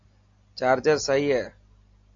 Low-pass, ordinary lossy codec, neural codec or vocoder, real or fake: 7.2 kHz; AAC, 48 kbps; none; real